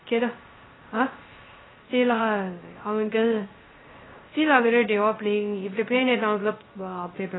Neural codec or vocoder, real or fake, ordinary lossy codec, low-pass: codec, 16 kHz, 0.3 kbps, FocalCodec; fake; AAC, 16 kbps; 7.2 kHz